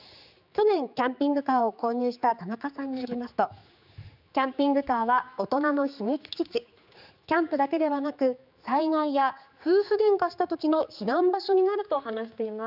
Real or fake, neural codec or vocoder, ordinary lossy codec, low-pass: fake; codec, 16 kHz, 4 kbps, X-Codec, HuBERT features, trained on general audio; none; 5.4 kHz